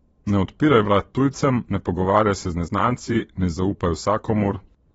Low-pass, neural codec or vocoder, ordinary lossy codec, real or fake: 9.9 kHz; vocoder, 22.05 kHz, 80 mel bands, Vocos; AAC, 24 kbps; fake